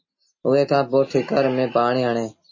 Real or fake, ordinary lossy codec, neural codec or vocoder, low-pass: real; MP3, 32 kbps; none; 7.2 kHz